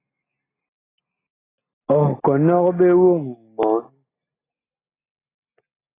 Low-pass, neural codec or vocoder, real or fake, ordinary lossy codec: 3.6 kHz; none; real; AAC, 16 kbps